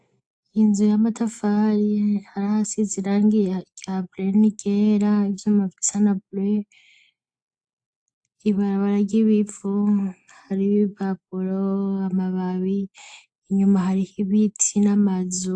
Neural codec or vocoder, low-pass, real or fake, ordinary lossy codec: none; 9.9 kHz; real; AAC, 64 kbps